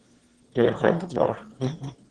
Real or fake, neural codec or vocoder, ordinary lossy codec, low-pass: fake; autoencoder, 22.05 kHz, a latent of 192 numbers a frame, VITS, trained on one speaker; Opus, 16 kbps; 9.9 kHz